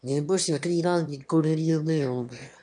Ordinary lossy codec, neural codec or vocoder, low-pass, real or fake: MP3, 96 kbps; autoencoder, 22.05 kHz, a latent of 192 numbers a frame, VITS, trained on one speaker; 9.9 kHz; fake